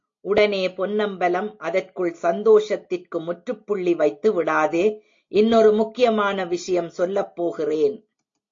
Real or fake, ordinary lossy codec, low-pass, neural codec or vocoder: real; AAC, 48 kbps; 7.2 kHz; none